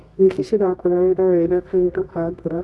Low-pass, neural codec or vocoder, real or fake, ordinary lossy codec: none; codec, 24 kHz, 0.9 kbps, WavTokenizer, medium music audio release; fake; none